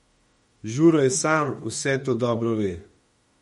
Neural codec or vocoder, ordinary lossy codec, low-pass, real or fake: autoencoder, 48 kHz, 32 numbers a frame, DAC-VAE, trained on Japanese speech; MP3, 48 kbps; 19.8 kHz; fake